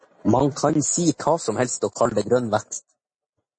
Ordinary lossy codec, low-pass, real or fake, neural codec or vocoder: MP3, 32 kbps; 9.9 kHz; real; none